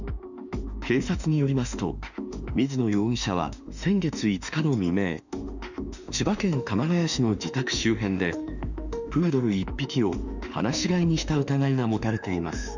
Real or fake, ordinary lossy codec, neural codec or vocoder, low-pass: fake; none; autoencoder, 48 kHz, 32 numbers a frame, DAC-VAE, trained on Japanese speech; 7.2 kHz